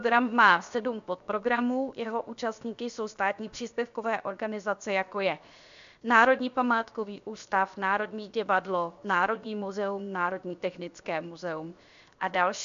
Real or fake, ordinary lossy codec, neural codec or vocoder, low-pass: fake; AAC, 96 kbps; codec, 16 kHz, 0.7 kbps, FocalCodec; 7.2 kHz